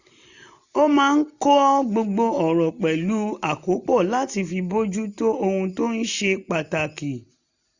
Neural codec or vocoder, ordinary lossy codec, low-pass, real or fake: none; none; 7.2 kHz; real